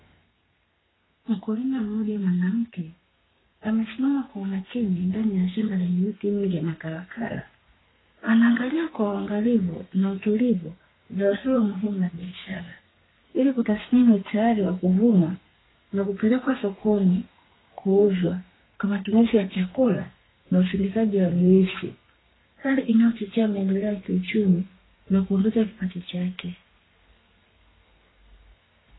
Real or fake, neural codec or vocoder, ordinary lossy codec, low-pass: fake; codec, 32 kHz, 1.9 kbps, SNAC; AAC, 16 kbps; 7.2 kHz